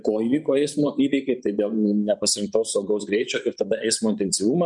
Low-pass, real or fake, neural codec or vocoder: 10.8 kHz; fake; vocoder, 24 kHz, 100 mel bands, Vocos